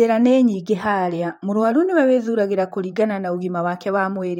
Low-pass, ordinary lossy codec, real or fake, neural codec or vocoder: 19.8 kHz; MP3, 64 kbps; fake; vocoder, 44.1 kHz, 128 mel bands, Pupu-Vocoder